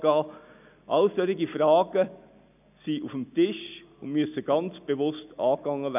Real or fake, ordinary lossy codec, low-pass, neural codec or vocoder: real; none; 3.6 kHz; none